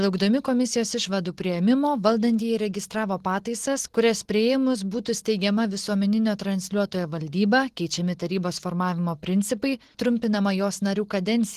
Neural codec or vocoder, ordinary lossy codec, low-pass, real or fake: none; Opus, 16 kbps; 14.4 kHz; real